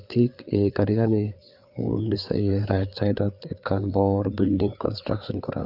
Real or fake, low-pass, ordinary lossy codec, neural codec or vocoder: fake; 5.4 kHz; none; codec, 16 kHz, 4 kbps, FreqCodec, larger model